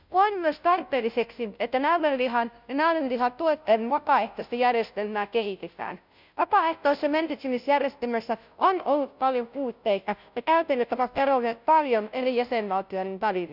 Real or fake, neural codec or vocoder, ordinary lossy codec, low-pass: fake; codec, 16 kHz, 0.5 kbps, FunCodec, trained on Chinese and English, 25 frames a second; none; 5.4 kHz